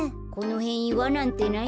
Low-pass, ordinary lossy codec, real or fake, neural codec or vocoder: none; none; real; none